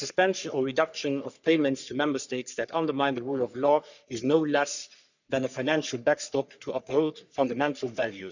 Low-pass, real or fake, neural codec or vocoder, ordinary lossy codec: 7.2 kHz; fake; codec, 44.1 kHz, 3.4 kbps, Pupu-Codec; none